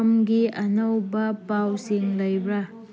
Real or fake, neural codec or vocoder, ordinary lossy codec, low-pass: real; none; none; none